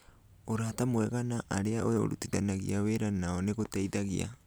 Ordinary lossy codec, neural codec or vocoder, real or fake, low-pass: none; none; real; none